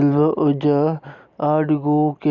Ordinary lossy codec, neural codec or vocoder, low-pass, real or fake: Opus, 64 kbps; none; 7.2 kHz; real